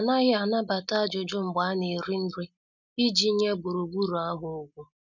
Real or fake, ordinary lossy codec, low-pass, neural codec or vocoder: real; none; 7.2 kHz; none